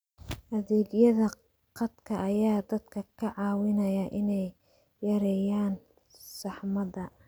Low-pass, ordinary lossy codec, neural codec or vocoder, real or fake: none; none; none; real